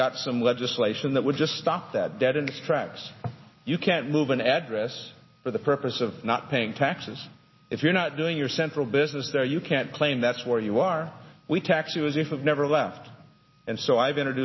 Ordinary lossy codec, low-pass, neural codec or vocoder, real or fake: MP3, 24 kbps; 7.2 kHz; none; real